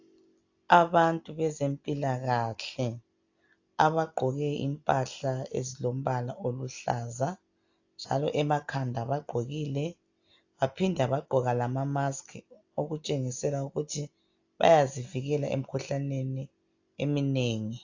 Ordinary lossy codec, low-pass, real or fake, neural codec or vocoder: AAC, 48 kbps; 7.2 kHz; real; none